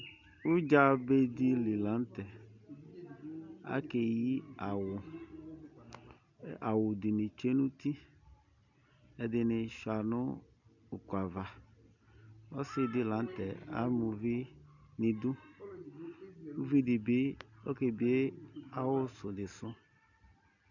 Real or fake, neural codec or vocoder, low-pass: real; none; 7.2 kHz